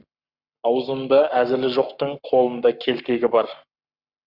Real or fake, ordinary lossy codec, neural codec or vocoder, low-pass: fake; Opus, 64 kbps; codec, 44.1 kHz, 7.8 kbps, Pupu-Codec; 5.4 kHz